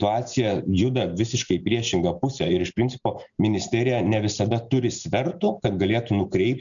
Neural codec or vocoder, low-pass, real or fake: none; 7.2 kHz; real